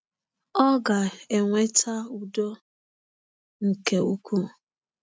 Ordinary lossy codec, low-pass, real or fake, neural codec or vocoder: none; none; real; none